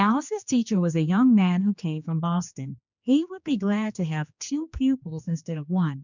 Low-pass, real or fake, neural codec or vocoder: 7.2 kHz; fake; codec, 16 kHz, 2 kbps, X-Codec, HuBERT features, trained on general audio